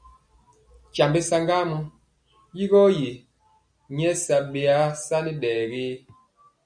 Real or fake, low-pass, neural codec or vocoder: real; 9.9 kHz; none